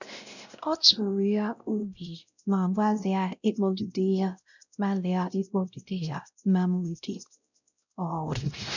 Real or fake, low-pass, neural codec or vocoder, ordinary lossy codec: fake; 7.2 kHz; codec, 16 kHz, 0.5 kbps, X-Codec, WavLM features, trained on Multilingual LibriSpeech; none